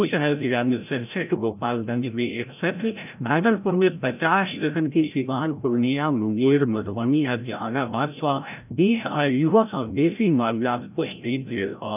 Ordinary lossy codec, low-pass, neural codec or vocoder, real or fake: none; 3.6 kHz; codec, 16 kHz, 0.5 kbps, FreqCodec, larger model; fake